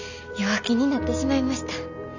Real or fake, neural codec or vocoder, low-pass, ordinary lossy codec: real; none; 7.2 kHz; none